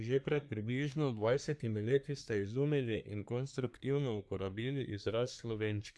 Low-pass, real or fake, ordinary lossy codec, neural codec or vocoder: none; fake; none; codec, 24 kHz, 1 kbps, SNAC